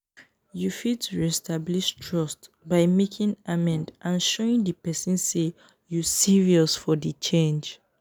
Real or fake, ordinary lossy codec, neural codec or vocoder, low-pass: real; none; none; none